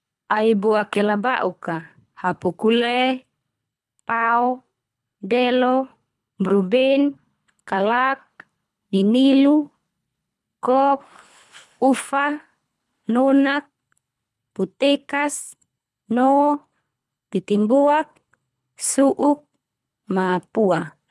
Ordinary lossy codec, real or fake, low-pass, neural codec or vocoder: none; fake; none; codec, 24 kHz, 3 kbps, HILCodec